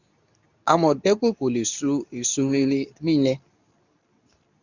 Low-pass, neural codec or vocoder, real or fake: 7.2 kHz; codec, 24 kHz, 0.9 kbps, WavTokenizer, medium speech release version 2; fake